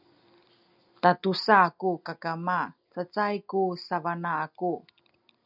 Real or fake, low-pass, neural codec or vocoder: real; 5.4 kHz; none